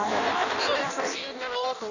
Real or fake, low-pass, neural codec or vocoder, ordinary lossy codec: fake; 7.2 kHz; codec, 16 kHz in and 24 kHz out, 0.6 kbps, FireRedTTS-2 codec; none